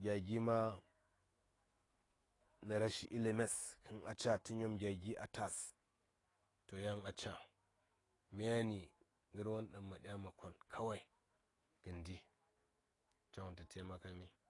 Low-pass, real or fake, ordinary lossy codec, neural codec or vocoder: 10.8 kHz; fake; AAC, 32 kbps; autoencoder, 48 kHz, 128 numbers a frame, DAC-VAE, trained on Japanese speech